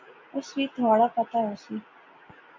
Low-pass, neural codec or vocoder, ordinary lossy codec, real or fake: 7.2 kHz; none; MP3, 48 kbps; real